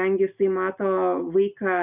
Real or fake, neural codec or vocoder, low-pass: real; none; 3.6 kHz